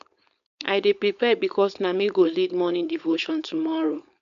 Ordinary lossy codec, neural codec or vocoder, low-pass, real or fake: none; codec, 16 kHz, 4.8 kbps, FACodec; 7.2 kHz; fake